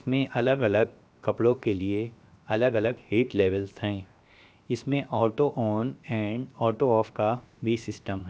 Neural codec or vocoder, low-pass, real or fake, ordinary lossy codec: codec, 16 kHz, 0.7 kbps, FocalCodec; none; fake; none